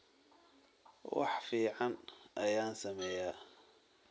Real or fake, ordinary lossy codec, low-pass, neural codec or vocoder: real; none; none; none